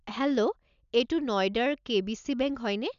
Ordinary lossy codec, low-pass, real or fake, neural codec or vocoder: none; 7.2 kHz; real; none